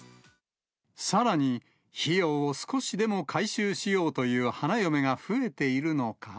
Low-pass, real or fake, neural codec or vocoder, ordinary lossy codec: none; real; none; none